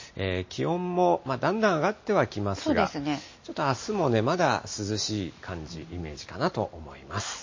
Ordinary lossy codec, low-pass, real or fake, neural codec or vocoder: MP3, 32 kbps; 7.2 kHz; real; none